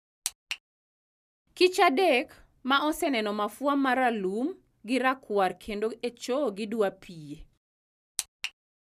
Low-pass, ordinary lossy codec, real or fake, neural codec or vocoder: 14.4 kHz; none; real; none